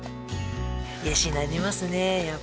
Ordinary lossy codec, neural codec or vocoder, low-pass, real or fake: none; none; none; real